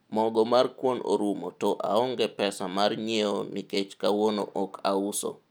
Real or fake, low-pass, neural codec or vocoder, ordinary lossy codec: real; none; none; none